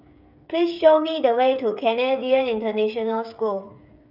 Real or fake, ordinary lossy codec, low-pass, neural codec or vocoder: fake; none; 5.4 kHz; codec, 16 kHz, 8 kbps, FreqCodec, smaller model